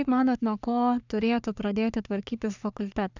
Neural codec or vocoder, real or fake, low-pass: codec, 44.1 kHz, 3.4 kbps, Pupu-Codec; fake; 7.2 kHz